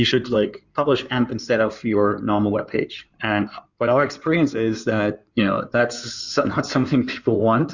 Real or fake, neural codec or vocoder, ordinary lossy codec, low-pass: fake; codec, 16 kHz in and 24 kHz out, 2.2 kbps, FireRedTTS-2 codec; Opus, 64 kbps; 7.2 kHz